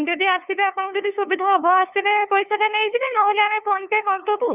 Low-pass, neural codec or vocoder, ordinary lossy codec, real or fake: 3.6 kHz; codec, 16 kHz, 2 kbps, FunCodec, trained on LibriTTS, 25 frames a second; none; fake